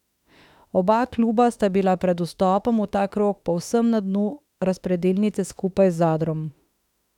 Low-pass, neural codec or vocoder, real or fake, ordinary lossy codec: 19.8 kHz; autoencoder, 48 kHz, 32 numbers a frame, DAC-VAE, trained on Japanese speech; fake; none